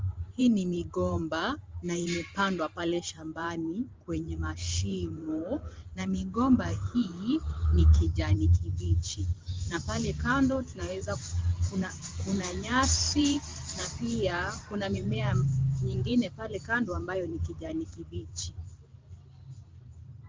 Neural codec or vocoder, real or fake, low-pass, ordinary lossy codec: vocoder, 44.1 kHz, 128 mel bands every 512 samples, BigVGAN v2; fake; 7.2 kHz; Opus, 32 kbps